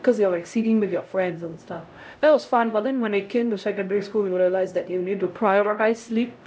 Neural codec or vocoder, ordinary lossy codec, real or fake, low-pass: codec, 16 kHz, 0.5 kbps, X-Codec, HuBERT features, trained on LibriSpeech; none; fake; none